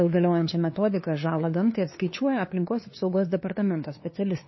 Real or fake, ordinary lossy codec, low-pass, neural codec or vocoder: fake; MP3, 24 kbps; 7.2 kHz; codec, 16 kHz, 2 kbps, FunCodec, trained on LibriTTS, 25 frames a second